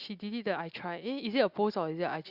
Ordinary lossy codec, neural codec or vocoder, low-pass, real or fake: Opus, 64 kbps; none; 5.4 kHz; real